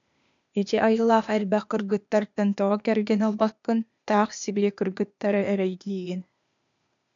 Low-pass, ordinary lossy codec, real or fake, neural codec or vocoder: 7.2 kHz; MP3, 96 kbps; fake; codec, 16 kHz, 0.8 kbps, ZipCodec